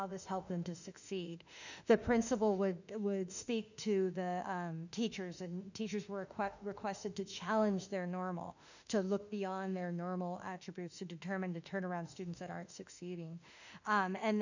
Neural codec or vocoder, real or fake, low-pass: autoencoder, 48 kHz, 32 numbers a frame, DAC-VAE, trained on Japanese speech; fake; 7.2 kHz